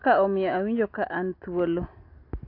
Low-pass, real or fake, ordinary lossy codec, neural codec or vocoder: 5.4 kHz; real; AAC, 32 kbps; none